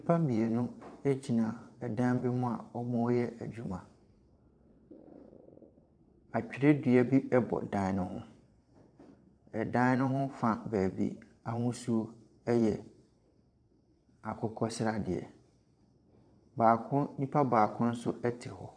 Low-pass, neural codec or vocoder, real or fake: 9.9 kHz; vocoder, 22.05 kHz, 80 mel bands, Vocos; fake